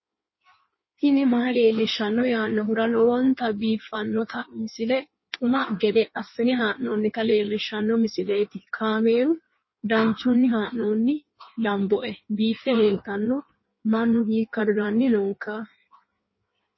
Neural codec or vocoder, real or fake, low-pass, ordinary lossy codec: codec, 16 kHz in and 24 kHz out, 1.1 kbps, FireRedTTS-2 codec; fake; 7.2 kHz; MP3, 24 kbps